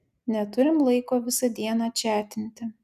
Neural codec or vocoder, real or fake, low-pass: none; real; 14.4 kHz